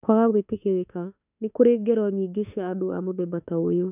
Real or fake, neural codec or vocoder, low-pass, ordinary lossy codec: fake; autoencoder, 48 kHz, 32 numbers a frame, DAC-VAE, trained on Japanese speech; 3.6 kHz; none